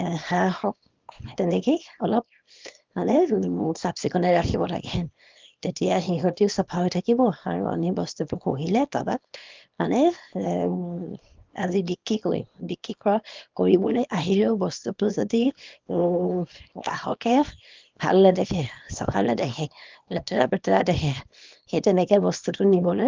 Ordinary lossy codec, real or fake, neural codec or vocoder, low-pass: Opus, 24 kbps; fake; codec, 24 kHz, 0.9 kbps, WavTokenizer, small release; 7.2 kHz